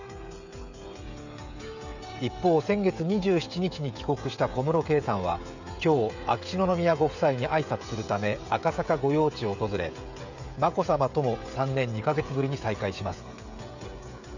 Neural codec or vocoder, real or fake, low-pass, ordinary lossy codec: codec, 16 kHz, 16 kbps, FreqCodec, smaller model; fake; 7.2 kHz; none